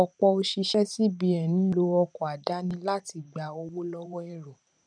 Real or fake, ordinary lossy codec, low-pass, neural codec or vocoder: fake; none; 9.9 kHz; vocoder, 44.1 kHz, 128 mel bands every 512 samples, BigVGAN v2